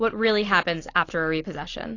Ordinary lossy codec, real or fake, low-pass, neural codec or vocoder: AAC, 32 kbps; real; 7.2 kHz; none